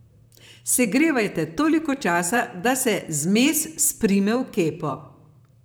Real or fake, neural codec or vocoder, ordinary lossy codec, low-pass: fake; vocoder, 44.1 kHz, 128 mel bands, Pupu-Vocoder; none; none